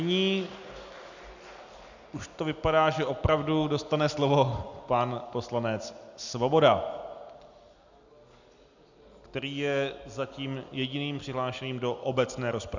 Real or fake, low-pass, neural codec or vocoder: real; 7.2 kHz; none